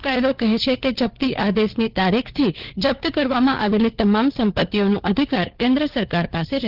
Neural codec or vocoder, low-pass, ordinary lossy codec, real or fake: codec, 16 kHz, 4 kbps, FunCodec, trained on LibriTTS, 50 frames a second; 5.4 kHz; Opus, 16 kbps; fake